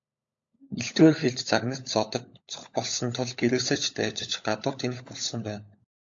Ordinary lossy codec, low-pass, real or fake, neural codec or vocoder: AAC, 64 kbps; 7.2 kHz; fake; codec, 16 kHz, 16 kbps, FunCodec, trained on LibriTTS, 50 frames a second